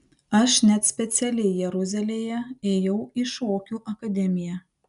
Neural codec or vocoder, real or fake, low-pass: none; real; 10.8 kHz